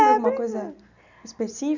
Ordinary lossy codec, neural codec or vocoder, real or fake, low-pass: none; none; real; 7.2 kHz